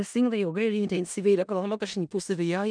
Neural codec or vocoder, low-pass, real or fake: codec, 16 kHz in and 24 kHz out, 0.4 kbps, LongCat-Audio-Codec, four codebook decoder; 9.9 kHz; fake